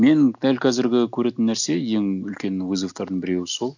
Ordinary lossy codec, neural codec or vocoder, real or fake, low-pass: none; none; real; 7.2 kHz